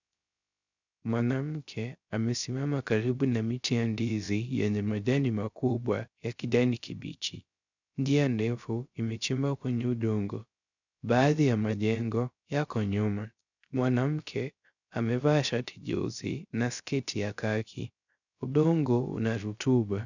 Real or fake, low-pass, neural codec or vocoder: fake; 7.2 kHz; codec, 16 kHz, 0.3 kbps, FocalCodec